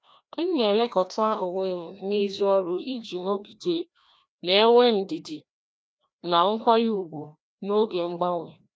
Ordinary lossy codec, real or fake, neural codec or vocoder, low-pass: none; fake; codec, 16 kHz, 1 kbps, FreqCodec, larger model; none